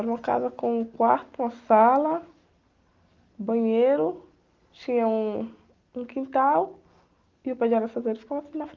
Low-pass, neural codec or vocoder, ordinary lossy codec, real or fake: 7.2 kHz; none; Opus, 32 kbps; real